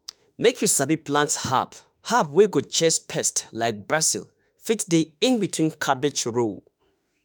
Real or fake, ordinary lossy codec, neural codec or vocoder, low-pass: fake; none; autoencoder, 48 kHz, 32 numbers a frame, DAC-VAE, trained on Japanese speech; none